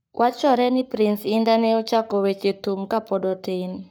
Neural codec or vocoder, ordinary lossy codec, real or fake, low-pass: codec, 44.1 kHz, 7.8 kbps, Pupu-Codec; none; fake; none